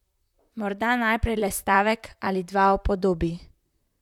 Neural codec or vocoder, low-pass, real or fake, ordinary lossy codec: vocoder, 44.1 kHz, 128 mel bands, Pupu-Vocoder; 19.8 kHz; fake; none